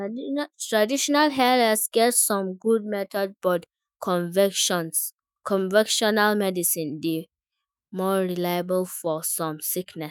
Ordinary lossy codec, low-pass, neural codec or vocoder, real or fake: none; none; autoencoder, 48 kHz, 128 numbers a frame, DAC-VAE, trained on Japanese speech; fake